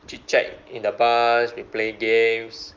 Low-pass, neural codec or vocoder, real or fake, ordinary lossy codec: 7.2 kHz; none; real; Opus, 24 kbps